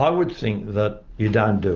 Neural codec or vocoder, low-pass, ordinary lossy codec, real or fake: none; 7.2 kHz; Opus, 24 kbps; real